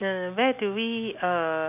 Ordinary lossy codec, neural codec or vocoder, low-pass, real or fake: none; none; 3.6 kHz; real